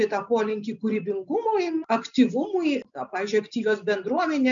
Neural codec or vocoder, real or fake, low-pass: none; real; 7.2 kHz